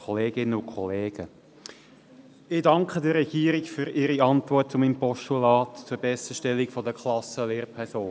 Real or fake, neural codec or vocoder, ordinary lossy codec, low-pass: real; none; none; none